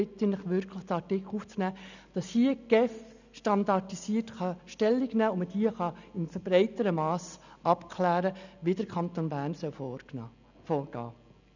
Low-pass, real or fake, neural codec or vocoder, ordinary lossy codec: 7.2 kHz; real; none; none